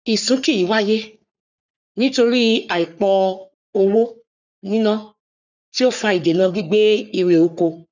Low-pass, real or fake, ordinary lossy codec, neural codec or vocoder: 7.2 kHz; fake; none; codec, 44.1 kHz, 3.4 kbps, Pupu-Codec